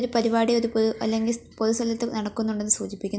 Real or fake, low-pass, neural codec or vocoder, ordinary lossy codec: real; none; none; none